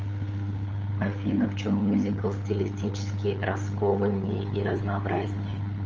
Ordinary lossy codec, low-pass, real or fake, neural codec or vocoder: Opus, 24 kbps; 7.2 kHz; fake; codec, 16 kHz, 8 kbps, FunCodec, trained on LibriTTS, 25 frames a second